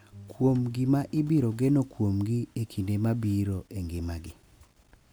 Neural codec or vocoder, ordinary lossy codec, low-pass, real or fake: none; none; none; real